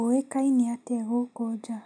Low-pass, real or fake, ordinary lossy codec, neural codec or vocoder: 9.9 kHz; real; none; none